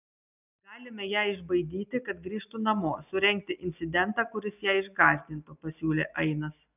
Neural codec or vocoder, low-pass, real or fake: none; 3.6 kHz; real